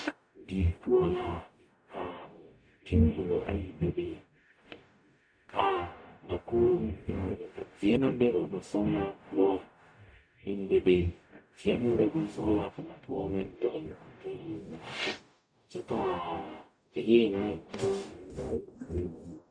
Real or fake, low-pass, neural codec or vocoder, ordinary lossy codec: fake; 9.9 kHz; codec, 44.1 kHz, 0.9 kbps, DAC; MP3, 96 kbps